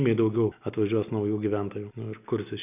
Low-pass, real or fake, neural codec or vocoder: 3.6 kHz; real; none